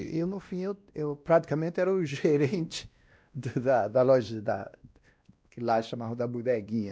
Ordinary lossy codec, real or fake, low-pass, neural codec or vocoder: none; fake; none; codec, 16 kHz, 1 kbps, X-Codec, WavLM features, trained on Multilingual LibriSpeech